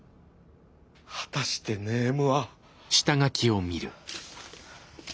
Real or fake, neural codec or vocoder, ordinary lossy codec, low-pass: real; none; none; none